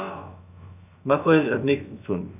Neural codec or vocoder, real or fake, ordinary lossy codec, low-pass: codec, 16 kHz, about 1 kbps, DyCAST, with the encoder's durations; fake; none; 3.6 kHz